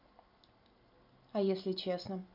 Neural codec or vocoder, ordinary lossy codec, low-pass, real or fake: none; none; 5.4 kHz; real